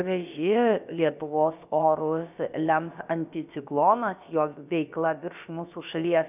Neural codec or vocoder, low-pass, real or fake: codec, 16 kHz, about 1 kbps, DyCAST, with the encoder's durations; 3.6 kHz; fake